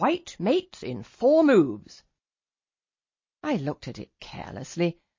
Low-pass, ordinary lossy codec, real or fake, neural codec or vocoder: 7.2 kHz; MP3, 32 kbps; real; none